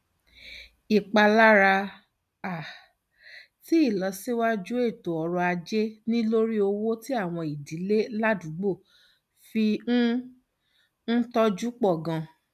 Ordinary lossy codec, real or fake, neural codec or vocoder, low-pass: none; real; none; 14.4 kHz